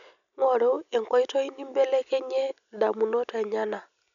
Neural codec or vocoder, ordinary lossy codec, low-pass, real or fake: none; none; 7.2 kHz; real